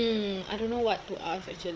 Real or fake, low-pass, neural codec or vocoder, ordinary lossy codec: fake; none; codec, 16 kHz, 8 kbps, FreqCodec, larger model; none